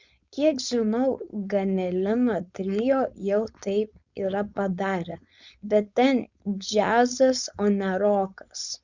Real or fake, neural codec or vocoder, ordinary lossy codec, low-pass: fake; codec, 16 kHz, 4.8 kbps, FACodec; Opus, 64 kbps; 7.2 kHz